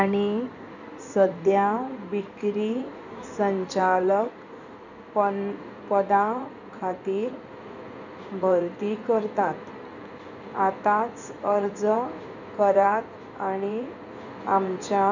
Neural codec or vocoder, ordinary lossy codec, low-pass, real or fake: codec, 16 kHz in and 24 kHz out, 2.2 kbps, FireRedTTS-2 codec; none; 7.2 kHz; fake